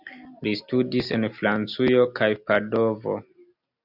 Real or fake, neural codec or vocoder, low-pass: real; none; 5.4 kHz